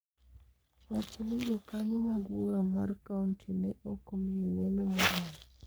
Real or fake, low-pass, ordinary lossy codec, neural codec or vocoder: fake; none; none; codec, 44.1 kHz, 3.4 kbps, Pupu-Codec